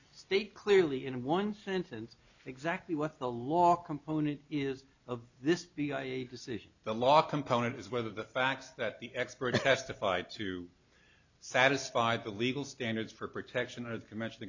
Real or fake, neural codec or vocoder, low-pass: real; none; 7.2 kHz